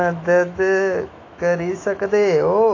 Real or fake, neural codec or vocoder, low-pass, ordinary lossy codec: fake; autoencoder, 48 kHz, 128 numbers a frame, DAC-VAE, trained on Japanese speech; 7.2 kHz; AAC, 32 kbps